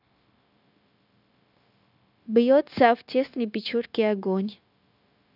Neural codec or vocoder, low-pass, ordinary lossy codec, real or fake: codec, 16 kHz, 0.9 kbps, LongCat-Audio-Codec; 5.4 kHz; none; fake